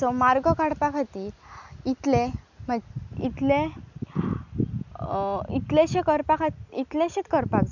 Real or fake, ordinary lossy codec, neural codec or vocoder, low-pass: real; none; none; 7.2 kHz